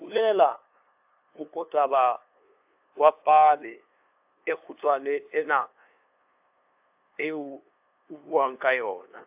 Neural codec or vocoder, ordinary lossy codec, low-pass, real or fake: codec, 16 kHz, 2 kbps, FunCodec, trained on LibriTTS, 25 frames a second; AAC, 32 kbps; 3.6 kHz; fake